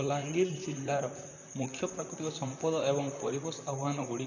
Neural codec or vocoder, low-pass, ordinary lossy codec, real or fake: vocoder, 44.1 kHz, 128 mel bands, Pupu-Vocoder; 7.2 kHz; none; fake